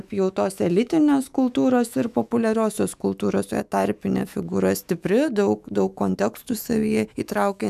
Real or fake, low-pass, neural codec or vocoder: fake; 14.4 kHz; autoencoder, 48 kHz, 128 numbers a frame, DAC-VAE, trained on Japanese speech